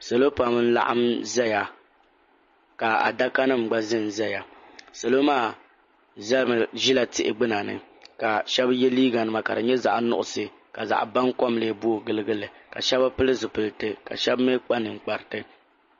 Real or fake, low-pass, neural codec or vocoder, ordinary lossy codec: real; 7.2 kHz; none; MP3, 32 kbps